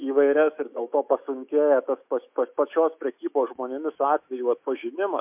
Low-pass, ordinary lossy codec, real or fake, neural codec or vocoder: 3.6 kHz; MP3, 32 kbps; fake; autoencoder, 48 kHz, 128 numbers a frame, DAC-VAE, trained on Japanese speech